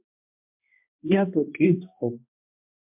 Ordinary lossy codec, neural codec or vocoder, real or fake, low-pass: MP3, 32 kbps; codec, 16 kHz, 1 kbps, X-Codec, HuBERT features, trained on balanced general audio; fake; 3.6 kHz